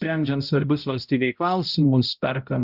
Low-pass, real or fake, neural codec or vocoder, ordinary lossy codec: 5.4 kHz; fake; codec, 16 kHz, 0.5 kbps, X-Codec, HuBERT features, trained on balanced general audio; Opus, 64 kbps